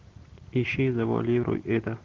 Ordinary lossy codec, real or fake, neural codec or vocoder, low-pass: Opus, 16 kbps; real; none; 7.2 kHz